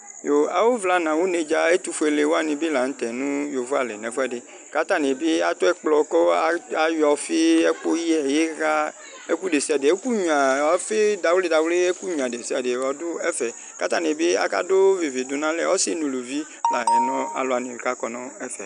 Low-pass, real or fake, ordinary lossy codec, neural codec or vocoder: 9.9 kHz; real; MP3, 96 kbps; none